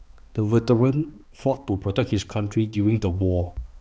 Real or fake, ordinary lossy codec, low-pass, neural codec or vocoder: fake; none; none; codec, 16 kHz, 2 kbps, X-Codec, HuBERT features, trained on balanced general audio